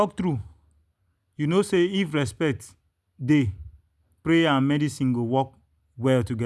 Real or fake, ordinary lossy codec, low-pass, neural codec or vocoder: real; none; none; none